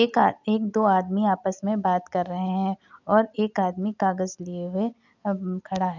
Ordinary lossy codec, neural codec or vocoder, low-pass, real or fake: none; none; 7.2 kHz; real